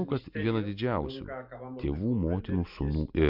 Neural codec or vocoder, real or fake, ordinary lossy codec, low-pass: none; real; MP3, 48 kbps; 5.4 kHz